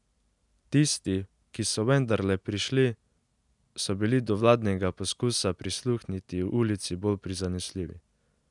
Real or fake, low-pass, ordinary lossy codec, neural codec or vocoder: real; 10.8 kHz; none; none